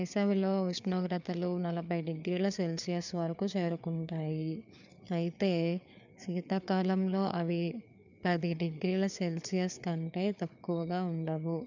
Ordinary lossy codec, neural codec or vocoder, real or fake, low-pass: none; codec, 16 kHz, 4 kbps, FreqCodec, larger model; fake; 7.2 kHz